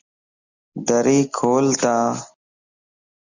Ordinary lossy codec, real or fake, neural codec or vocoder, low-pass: Opus, 64 kbps; real; none; 7.2 kHz